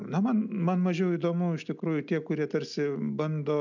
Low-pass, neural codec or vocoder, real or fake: 7.2 kHz; none; real